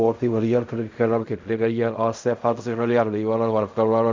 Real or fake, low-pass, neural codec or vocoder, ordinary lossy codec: fake; 7.2 kHz; codec, 16 kHz in and 24 kHz out, 0.4 kbps, LongCat-Audio-Codec, fine tuned four codebook decoder; MP3, 64 kbps